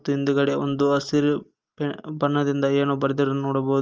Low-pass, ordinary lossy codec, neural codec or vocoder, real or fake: 7.2 kHz; Opus, 24 kbps; none; real